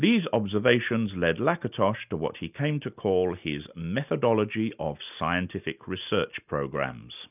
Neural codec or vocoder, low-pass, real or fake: none; 3.6 kHz; real